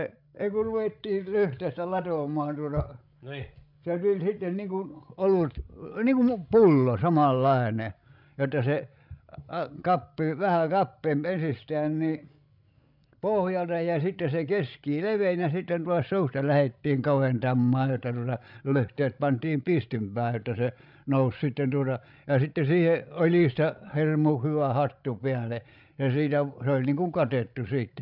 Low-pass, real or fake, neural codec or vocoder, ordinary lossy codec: 5.4 kHz; fake; codec, 16 kHz, 16 kbps, FreqCodec, larger model; none